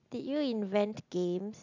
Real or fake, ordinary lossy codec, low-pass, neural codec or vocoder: real; AAC, 48 kbps; 7.2 kHz; none